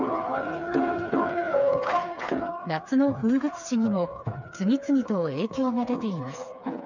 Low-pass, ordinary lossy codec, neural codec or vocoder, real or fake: 7.2 kHz; none; codec, 16 kHz, 4 kbps, FreqCodec, smaller model; fake